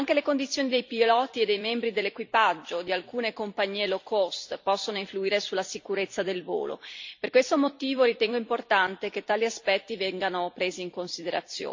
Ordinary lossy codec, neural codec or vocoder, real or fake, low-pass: MP3, 48 kbps; vocoder, 44.1 kHz, 128 mel bands every 256 samples, BigVGAN v2; fake; 7.2 kHz